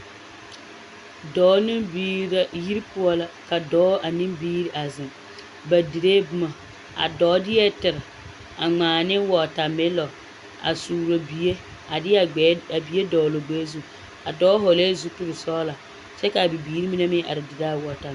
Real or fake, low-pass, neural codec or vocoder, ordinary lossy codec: real; 10.8 kHz; none; Opus, 64 kbps